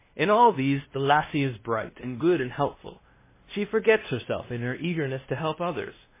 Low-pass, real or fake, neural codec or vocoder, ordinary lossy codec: 3.6 kHz; fake; codec, 16 kHz, 1 kbps, X-Codec, WavLM features, trained on Multilingual LibriSpeech; MP3, 16 kbps